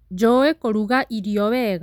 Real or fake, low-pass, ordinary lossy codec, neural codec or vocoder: real; 19.8 kHz; none; none